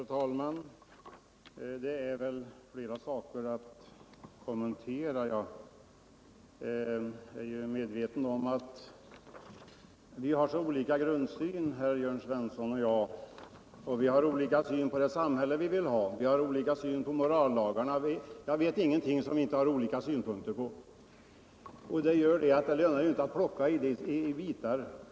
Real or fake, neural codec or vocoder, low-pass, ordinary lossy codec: real; none; none; none